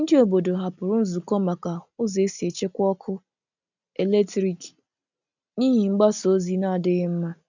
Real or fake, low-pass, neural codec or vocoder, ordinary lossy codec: real; 7.2 kHz; none; none